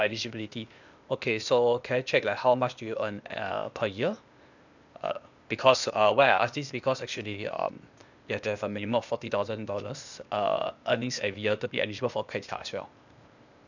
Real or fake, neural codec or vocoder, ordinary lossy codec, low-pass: fake; codec, 16 kHz, 0.8 kbps, ZipCodec; none; 7.2 kHz